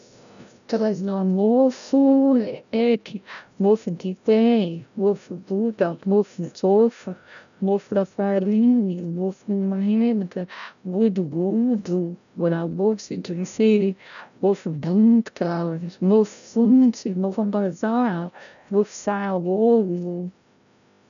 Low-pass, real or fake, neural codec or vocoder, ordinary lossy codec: 7.2 kHz; fake; codec, 16 kHz, 0.5 kbps, FreqCodec, larger model; none